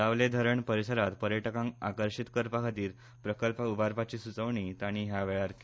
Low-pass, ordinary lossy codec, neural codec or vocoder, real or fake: none; none; none; real